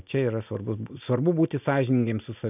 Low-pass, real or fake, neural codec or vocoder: 3.6 kHz; real; none